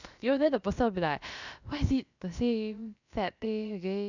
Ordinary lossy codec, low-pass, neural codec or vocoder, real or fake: none; 7.2 kHz; codec, 16 kHz, about 1 kbps, DyCAST, with the encoder's durations; fake